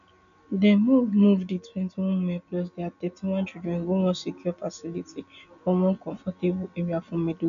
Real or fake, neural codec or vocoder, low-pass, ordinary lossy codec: real; none; 7.2 kHz; none